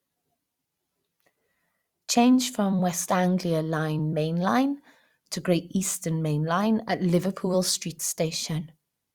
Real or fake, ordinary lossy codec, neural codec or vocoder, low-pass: fake; Opus, 64 kbps; vocoder, 44.1 kHz, 128 mel bands every 256 samples, BigVGAN v2; 19.8 kHz